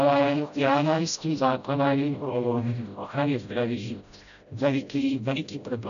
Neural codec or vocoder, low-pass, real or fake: codec, 16 kHz, 0.5 kbps, FreqCodec, smaller model; 7.2 kHz; fake